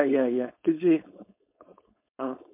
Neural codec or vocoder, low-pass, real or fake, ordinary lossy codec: codec, 16 kHz, 4.8 kbps, FACodec; 3.6 kHz; fake; MP3, 32 kbps